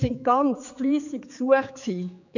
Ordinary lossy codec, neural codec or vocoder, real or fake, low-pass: none; codec, 16 kHz, 4 kbps, X-Codec, HuBERT features, trained on general audio; fake; 7.2 kHz